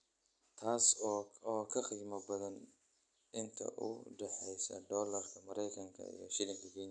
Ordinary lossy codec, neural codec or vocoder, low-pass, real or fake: none; none; 9.9 kHz; real